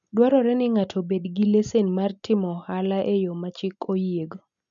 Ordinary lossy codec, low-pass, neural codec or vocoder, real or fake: none; 7.2 kHz; none; real